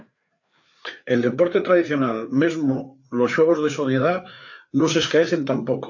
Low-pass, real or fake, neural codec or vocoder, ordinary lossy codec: 7.2 kHz; fake; codec, 16 kHz, 4 kbps, FreqCodec, larger model; AAC, 48 kbps